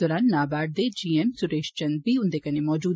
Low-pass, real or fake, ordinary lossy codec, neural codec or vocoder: none; real; none; none